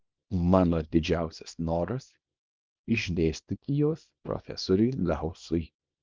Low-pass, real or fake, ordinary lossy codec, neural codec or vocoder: 7.2 kHz; fake; Opus, 24 kbps; codec, 24 kHz, 0.9 kbps, WavTokenizer, small release